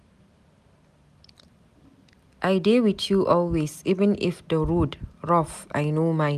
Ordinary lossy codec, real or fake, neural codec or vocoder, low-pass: none; real; none; 14.4 kHz